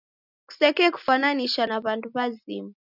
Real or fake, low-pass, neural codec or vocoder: real; 5.4 kHz; none